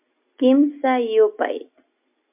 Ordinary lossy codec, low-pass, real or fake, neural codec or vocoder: MP3, 32 kbps; 3.6 kHz; real; none